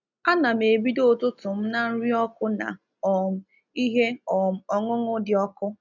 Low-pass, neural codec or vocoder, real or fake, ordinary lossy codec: 7.2 kHz; none; real; none